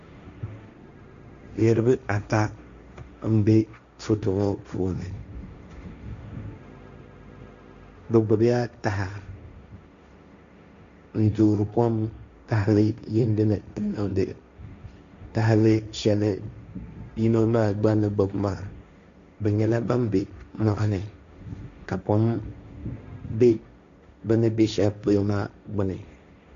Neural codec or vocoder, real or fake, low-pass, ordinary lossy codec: codec, 16 kHz, 1.1 kbps, Voila-Tokenizer; fake; 7.2 kHz; Opus, 64 kbps